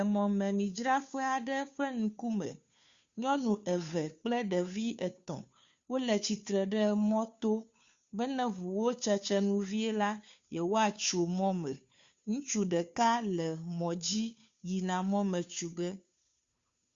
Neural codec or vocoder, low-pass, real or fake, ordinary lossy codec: codec, 16 kHz, 2 kbps, FunCodec, trained on Chinese and English, 25 frames a second; 7.2 kHz; fake; Opus, 64 kbps